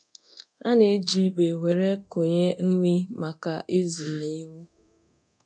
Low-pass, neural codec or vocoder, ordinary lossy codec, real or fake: 9.9 kHz; codec, 24 kHz, 0.9 kbps, DualCodec; none; fake